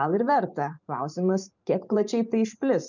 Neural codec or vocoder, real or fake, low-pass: vocoder, 44.1 kHz, 128 mel bands every 512 samples, BigVGAN v2; fake; 7.2 kHz